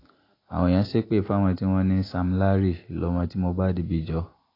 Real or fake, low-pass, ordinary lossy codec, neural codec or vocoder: real; 5.4 kHz; AAC, 24 kbps; none